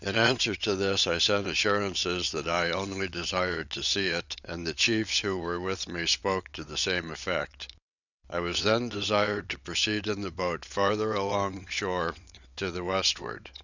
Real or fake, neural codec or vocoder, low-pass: fake; vocoder, 22.05 kHz, 80 mel bands, WaveNeXt; 7.2 kHz